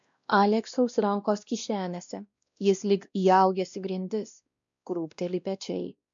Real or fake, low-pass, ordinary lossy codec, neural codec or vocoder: fake; 7.2 kHz; MP3, 64 kbps; codec, 16 kHz, 1 kbps, X-Codec, WavLM features, trained on Multilingual LibriSpeech